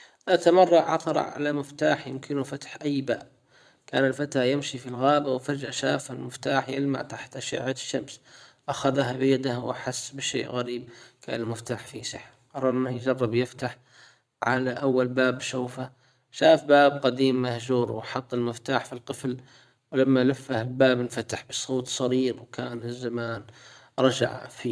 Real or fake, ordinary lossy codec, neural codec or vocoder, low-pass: fake; none; vocoder, 22.05 kHz, 80 mel bands, Vocos; none